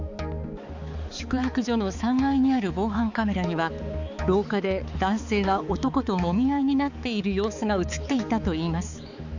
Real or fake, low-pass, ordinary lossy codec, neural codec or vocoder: fake; 7.2 kHz; none; codec, 16 kHz, 4 kbps, X-Codec, HuBERT features, trained on balanced general audio